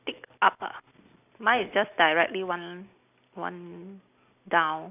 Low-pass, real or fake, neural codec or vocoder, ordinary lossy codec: 3.6 kHz; real; none; none